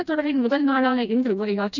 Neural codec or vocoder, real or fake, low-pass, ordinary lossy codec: codec, 16 kHz, 1 kbps, FreqCodec, smaller model; fake; 7.2 kHz; none